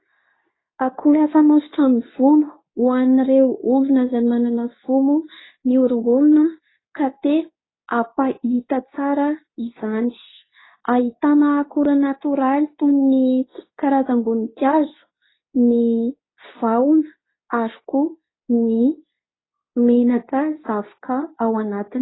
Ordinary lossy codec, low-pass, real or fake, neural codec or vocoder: AAC, 16 kbps; 7.2 kHz; fake; codec, 24 kHz, 0.9 kbps, WavTokenizer, medium speech release version 2